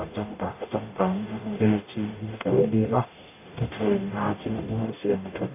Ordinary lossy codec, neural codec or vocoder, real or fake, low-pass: AAC, 32 kbps; codec, 44.1 kHz, 0.9 kbps, DAC; fake; 3.6 kHz